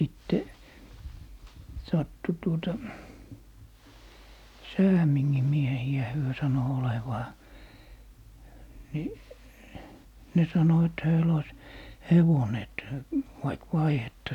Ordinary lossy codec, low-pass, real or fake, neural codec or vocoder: none; 19.8 kHz; real; none